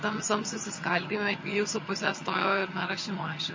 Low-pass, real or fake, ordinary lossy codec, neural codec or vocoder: 7.2 kHz; fake; MP3, 32 kbps; vocoder, 22.05 kHz, 80 mel bands, HiFi-GAN